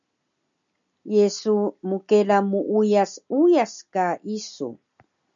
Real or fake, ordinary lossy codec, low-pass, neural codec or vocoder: real; MP3, 96 kbps; 7.2 kHz; none